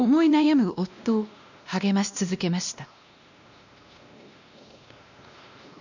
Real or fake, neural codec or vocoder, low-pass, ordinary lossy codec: fake; codec, 16 kHz, 1 kbps, X-Codec, WavLM features, trained on Multilingual LibriSpeech; 7.2 kHz; none